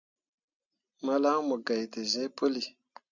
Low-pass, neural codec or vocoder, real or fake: 7.2 kHz; none; real